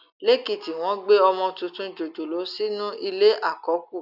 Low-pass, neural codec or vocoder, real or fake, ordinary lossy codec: 5.4 kHz; none; real; none